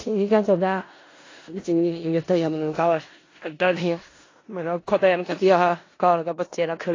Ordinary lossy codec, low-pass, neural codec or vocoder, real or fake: AAC, 32 kbps; 7.2 kHz; codec, 16 kHz in and 24 kHz out, 0.4 kbps, LongCat-Audio-Codec, four codebook decoder; fake